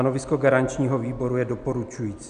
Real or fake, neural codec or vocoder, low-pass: real; none; 9.9 kHz